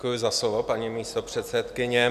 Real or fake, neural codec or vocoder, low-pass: real; none; 14.4 kHz